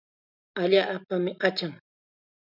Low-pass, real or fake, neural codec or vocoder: 5.4 kHz; real; none